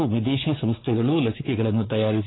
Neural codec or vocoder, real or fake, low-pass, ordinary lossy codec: codec, 16 kHz, 4 kbps, X-Codec, WavLM features, trained on Multilingual LibriSpeech; fake; 7.2 kHz; AAC, 16 kbps